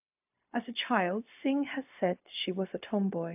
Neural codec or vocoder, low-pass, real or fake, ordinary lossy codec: codec, 16 kHz, 0.4 kbps, LongCat-Audio-Codec; 3.6 kHz; fake; AAC, 32 kbps